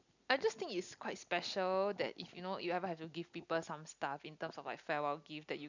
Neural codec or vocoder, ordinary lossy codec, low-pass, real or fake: none; none; 7.2 kHz; real